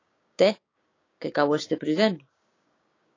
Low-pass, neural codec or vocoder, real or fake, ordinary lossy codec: 7.2 kHz; codec, 16 kHz, 6 kbps, DAC; fake; AAC, 32 kbps